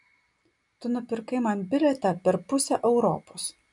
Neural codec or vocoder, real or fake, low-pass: none; real; 10.8 kHz